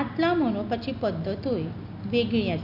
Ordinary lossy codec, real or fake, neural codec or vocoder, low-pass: none; real; none; 5.4 kHz